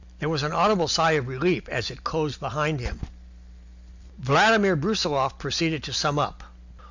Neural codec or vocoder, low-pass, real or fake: none; 7.2 kHz; real